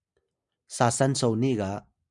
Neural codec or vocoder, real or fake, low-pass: none; real; 10.8 kHz